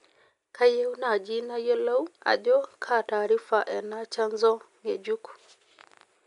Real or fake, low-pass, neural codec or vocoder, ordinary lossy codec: real; 10.8 kHz; none; none